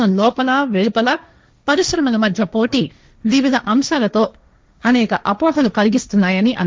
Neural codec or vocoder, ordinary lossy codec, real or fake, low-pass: codec, 16 kHz, 1.1 kbps, Voila-Tokenizer; none; fake; none